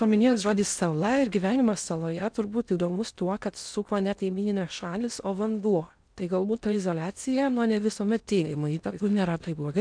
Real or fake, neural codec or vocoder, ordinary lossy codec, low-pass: fake; codec, 16 kHz in and 24 kHz out, 0.6 kbps, FocalCodec, streaming, 4096 codes; MP3, 96 kbps; 9.9 kHz